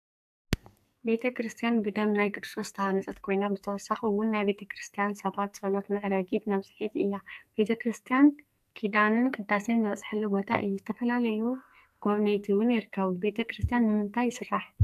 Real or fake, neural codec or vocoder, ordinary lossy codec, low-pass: fake; codec, 44.1 kHz, 2.6 kbps, SNAC; AAC, 96 kbps; 14.4 kHz